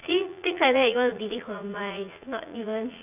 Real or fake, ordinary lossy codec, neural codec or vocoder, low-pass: fake; none; vocoder, 44.1 kHz, 80 mel bands, Vocos; 3.6 kHz